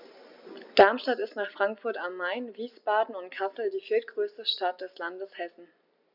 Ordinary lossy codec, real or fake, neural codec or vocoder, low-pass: none; real; none; 5.4 kHz